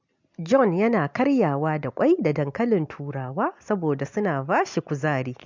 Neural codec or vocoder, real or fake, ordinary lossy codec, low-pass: none; real; MP3, 64 kbps; 7.2 kHz